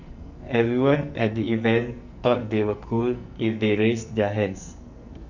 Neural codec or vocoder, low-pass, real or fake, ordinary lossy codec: codec, 44.1 kHz, 2.6 kbps, SNAC; 7.2 kHz; fake; none